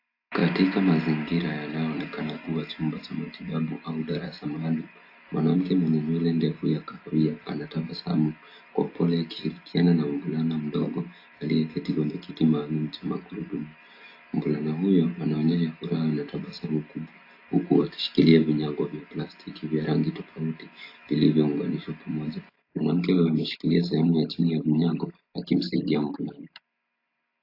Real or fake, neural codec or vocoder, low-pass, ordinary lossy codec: real; none; 5.4 kHz; AAC, 32 kbps